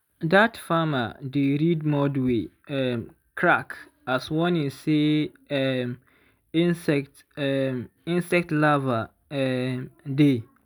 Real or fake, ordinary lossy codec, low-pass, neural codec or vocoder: real; none; none; none